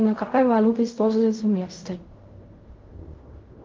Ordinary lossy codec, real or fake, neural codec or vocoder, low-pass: Opus, 32 kbps; fake; codec, 16 kHz in and 24 kHz out, 0.4 kbps, LongCat-Audio-Codec, fine tuned four codebook decoder; 7.2 kHz